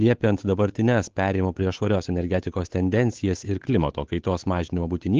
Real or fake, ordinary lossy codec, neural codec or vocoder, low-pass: fake; Opus, 16 kbps; codec, 16 kHz, 8 kbps, FunCodec, trained on Chinese and English, 25 frames a second; 7.2 kHz